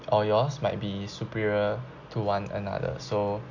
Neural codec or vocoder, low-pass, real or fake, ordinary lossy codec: none; 7.2 kHz; real; none